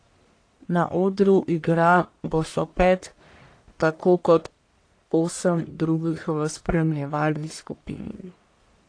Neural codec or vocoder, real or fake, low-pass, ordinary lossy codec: codec, 44.1 kHz, 1.7 kbps, Pupu-Codec; fake; 9.9 kHz; AAC, 48 kbps